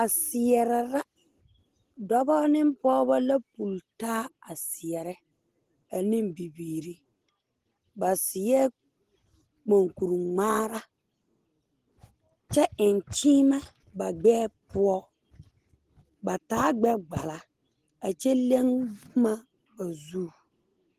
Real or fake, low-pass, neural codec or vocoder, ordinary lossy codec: real; 14.4 kHz; none; Opus, 16 kbps